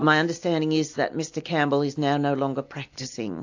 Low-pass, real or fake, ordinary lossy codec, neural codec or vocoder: 7.2 kHz; real; AAC, 48 kbps; none